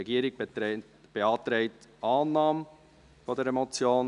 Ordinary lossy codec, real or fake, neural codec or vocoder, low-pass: none; real; none; 10.8 kHz